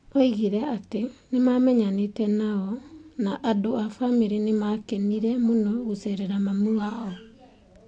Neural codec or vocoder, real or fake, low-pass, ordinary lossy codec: none; real; 9.9 kHz; AAC, 48 kbps